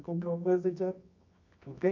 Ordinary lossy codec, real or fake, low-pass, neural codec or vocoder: none; fake; 7.2 kHz; codec, 24 kHz, 0.9 kbps, WavTokenizer, medium music audio release